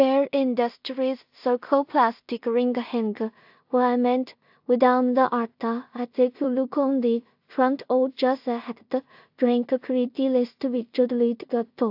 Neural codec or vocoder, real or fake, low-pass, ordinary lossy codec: codec, 16 kHz in and 24 kHz out, 0.4 kbps, LongCat-Audio-Codec, two codebook decoder; fake; 5.4 kHz; AAC, 48 kbps